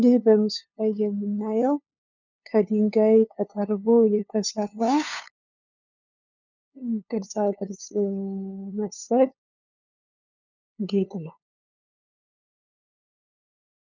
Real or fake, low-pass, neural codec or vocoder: fake; 7.2 kHz; codec, 16 kHz, 2 kbps, FunCodec, trained on LibriTTS, 25 frames a second